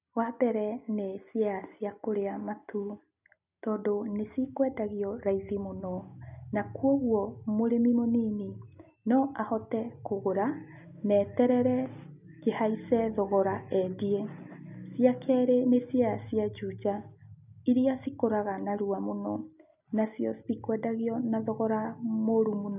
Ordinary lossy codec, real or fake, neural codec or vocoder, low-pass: none; real; none; 3.6 kHz